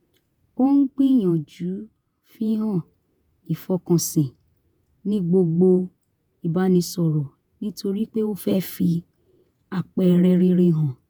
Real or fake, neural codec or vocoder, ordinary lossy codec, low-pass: fake; vocoder, 48 kHz, 128 mel bands, Vocos; none; none